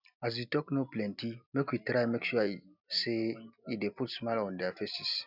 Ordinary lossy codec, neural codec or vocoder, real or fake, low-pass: none; none; real; 5.4 kHz